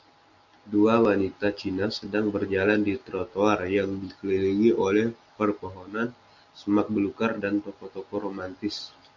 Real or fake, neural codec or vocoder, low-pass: real; none; 7.2 kHz